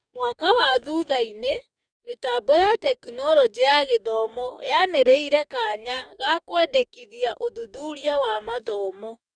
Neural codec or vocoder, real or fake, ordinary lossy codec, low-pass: codec, 44.1 kHz, 2.6 kbps, DAC; fake; none; 9.9 kHz